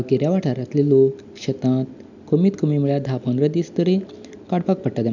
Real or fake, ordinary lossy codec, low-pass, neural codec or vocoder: real; none; 7.2 kHz; none